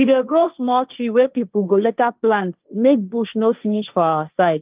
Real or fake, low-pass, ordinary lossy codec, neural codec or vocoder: fake; 3.6 kHz; Opus, 24 kbps; codec, 16 kHz, 1.1 kbps, Voila-Tokenizer